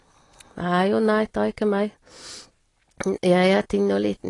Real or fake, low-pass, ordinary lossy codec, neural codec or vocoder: real; 10.8 kHz; AAC, 32 kbps; none